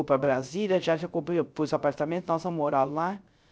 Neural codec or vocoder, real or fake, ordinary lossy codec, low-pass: codec, 16 kHz, 0.3 kbps, FocalCodec; fake; none; none